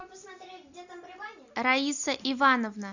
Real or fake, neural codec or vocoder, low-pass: real; none; 7.2 kHz